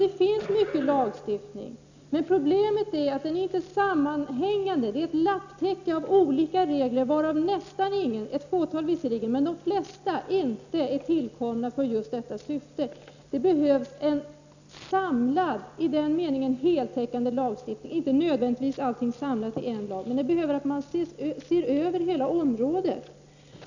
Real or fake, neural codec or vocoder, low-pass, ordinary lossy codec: real; none; 7.2 kHz; none